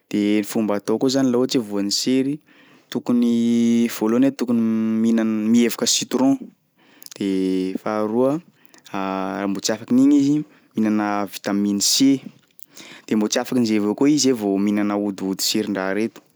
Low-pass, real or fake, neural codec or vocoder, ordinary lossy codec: none; real; none; none